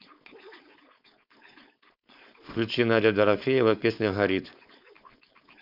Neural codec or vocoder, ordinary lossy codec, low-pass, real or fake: codec, 16 kHz, 4.8 kbps, FACodec; none; 5.4 kHz; fake